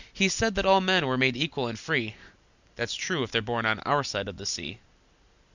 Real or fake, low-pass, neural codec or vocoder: real; 7.2 kHz; none